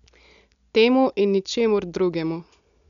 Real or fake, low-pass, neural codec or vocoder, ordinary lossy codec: real; 7.2 kHz; none; none